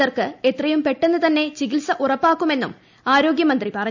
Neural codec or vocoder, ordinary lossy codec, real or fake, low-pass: none; none; real; 7.2 kHz